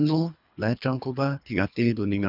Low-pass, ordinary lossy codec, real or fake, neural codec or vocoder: 5.4 kHz; none; fake; codec, 24 kHz, 3 kbps, HILCodec